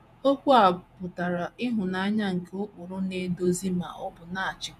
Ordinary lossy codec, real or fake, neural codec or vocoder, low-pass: none; fake; vocoder, 48 kHz, 128 mel bands, Vocos; 14.4 kHz